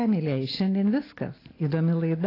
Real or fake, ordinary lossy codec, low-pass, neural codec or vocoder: real; AAC, 24 kbps; 5.4 kHz; none